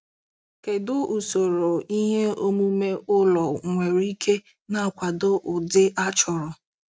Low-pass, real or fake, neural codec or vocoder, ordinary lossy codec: none; real; none; none